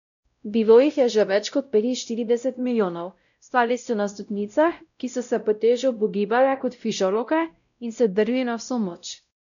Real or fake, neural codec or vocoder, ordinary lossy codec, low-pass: fake; codec, 16 kHz, 0.5 kbps, X-Codec, WavLM features, trained on Multilingual LibriSpeech; none; 7.2 kHz